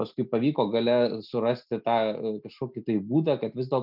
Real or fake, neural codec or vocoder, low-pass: real; none; 5.4 kHz